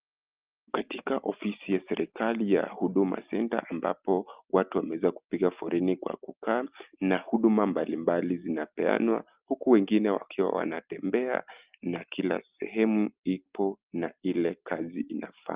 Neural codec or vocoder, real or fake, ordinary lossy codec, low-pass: none; real; Opus, 32 kbps; 3.6 kHz